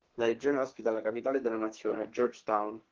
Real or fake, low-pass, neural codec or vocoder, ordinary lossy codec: fake; 7.2 kHz; codec, 32 kHz, 1.9 kbps, SNAC; Opus, 16 kbps